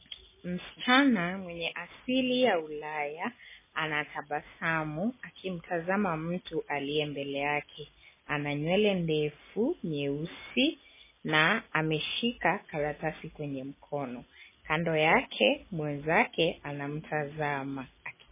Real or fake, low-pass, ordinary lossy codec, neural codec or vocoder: real; 3.6 kHz; MP3, 16 kbps; none